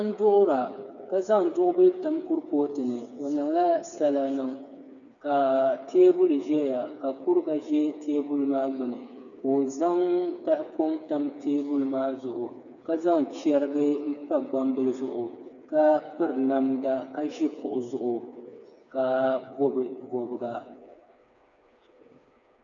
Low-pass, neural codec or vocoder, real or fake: 7.2 kHz; codec, 16 kHz, 4 kbps, FreqCodec, smaller model; fake